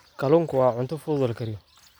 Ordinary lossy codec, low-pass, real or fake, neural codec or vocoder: none; none; real; none